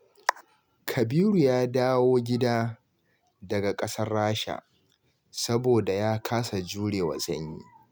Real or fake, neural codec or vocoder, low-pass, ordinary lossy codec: real; none; none; none